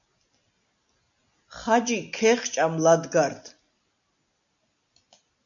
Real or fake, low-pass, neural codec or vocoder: real; 7.2 kHz; none